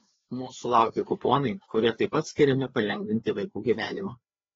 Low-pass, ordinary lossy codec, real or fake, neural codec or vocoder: 7.2 kHz; AAC, 24 kbps; fake; codec, 16 kHz, 2 kbps, FreqCodec, larger model